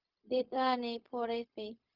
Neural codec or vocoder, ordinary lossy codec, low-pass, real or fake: codec, 16 kHz, 0.4 kbps, LongCat-Audio-Codec; Opus, 32 kbps; 5.4 kHz; fake